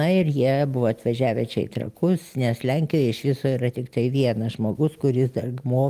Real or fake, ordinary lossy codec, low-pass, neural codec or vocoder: real; Opus, 32 kbps; 14.4 kHz; none